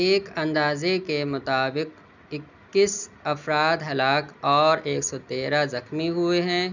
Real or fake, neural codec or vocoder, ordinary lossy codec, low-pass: real; none; none; 7.2 kHz